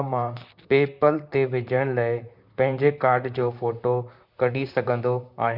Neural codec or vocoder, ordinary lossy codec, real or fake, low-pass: vocoder, 44.1 kHz, 128 mel bands, Pupu-Vocoder; none; fake; 5.4 kHz